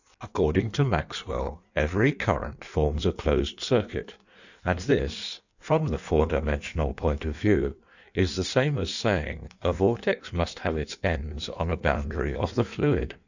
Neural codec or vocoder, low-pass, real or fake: codec, 16 kHz in and 24 kHz out, 1.1 kbps, FireRedTTS-2 codec; 7.2 kHz; fake